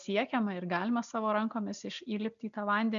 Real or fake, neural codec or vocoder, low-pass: real; none; 7.2 kHz